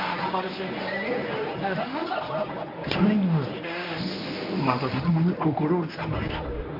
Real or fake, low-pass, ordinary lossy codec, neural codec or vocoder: fake; 5.4 kHz; MP3, 32 kbps; codec, 24 kHz, 0.9 kbps, WavTokenizer, medium speech release version 1